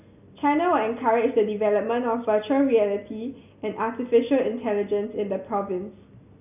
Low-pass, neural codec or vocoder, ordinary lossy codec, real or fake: 3.6 kHz; none; none; real